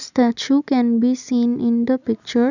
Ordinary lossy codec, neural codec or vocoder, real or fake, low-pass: none; none; real; 7.2 kHz